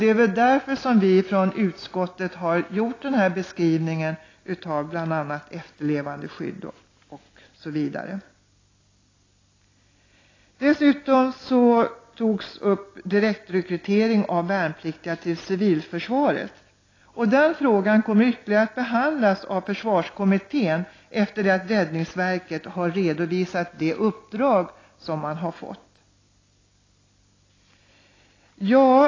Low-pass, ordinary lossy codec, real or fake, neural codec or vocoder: 7.2 kHz; AAC, 32 kbps; real; none